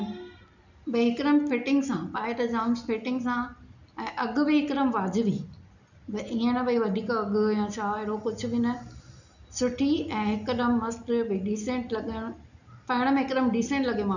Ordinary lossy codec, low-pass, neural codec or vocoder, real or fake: none; 7.2 kHz; none; real